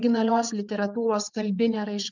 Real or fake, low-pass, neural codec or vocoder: fake; 7.2 kHz; vocoder, 22.05 kHz, 80 mel bands, WaveNeXt